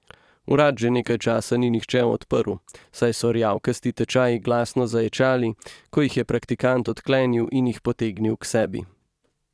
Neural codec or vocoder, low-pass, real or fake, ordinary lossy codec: vocoder, 22.05 kHz, 80 mel bands, Vocos; none; fake; none